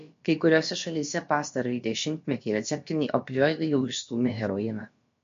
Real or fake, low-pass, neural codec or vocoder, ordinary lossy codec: fake; 7.2 kHz; codec, 16 kHz, about 1 kbps, DyCAST, with the encoder's durations; MP3, 48 kbps